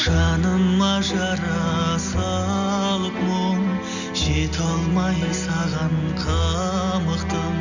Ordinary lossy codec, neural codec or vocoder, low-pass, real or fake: none; none; 7.2 kHz; real